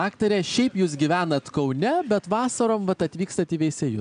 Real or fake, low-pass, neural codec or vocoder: real; 9.9 kHz; none